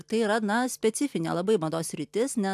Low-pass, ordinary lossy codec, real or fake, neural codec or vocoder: 14.4 kHz; AAC, 96 kbps; real; none